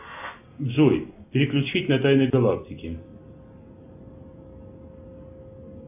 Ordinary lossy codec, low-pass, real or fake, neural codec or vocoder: AAC, 32 kbps; 3.6 kHz; real; none